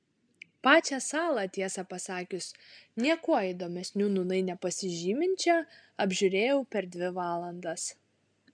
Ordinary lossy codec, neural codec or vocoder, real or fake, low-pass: MP3, 96 kbps; none; real; 9.9 kHz